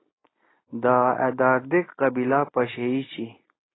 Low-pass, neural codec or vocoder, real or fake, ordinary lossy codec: 7.2 kHz; none; real; AAC, 16 kbps